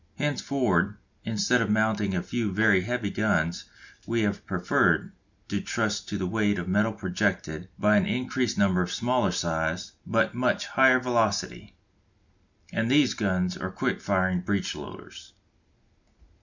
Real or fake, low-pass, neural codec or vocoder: real; 7.2 kHz; none